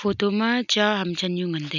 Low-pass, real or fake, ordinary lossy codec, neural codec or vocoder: 7.2 kHz; real; none; none